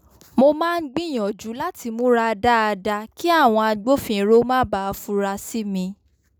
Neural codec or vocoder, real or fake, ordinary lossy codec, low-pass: none; real; none; none